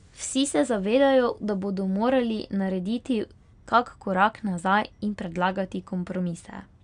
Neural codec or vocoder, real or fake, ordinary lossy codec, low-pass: none; real; none; 9.9 kHz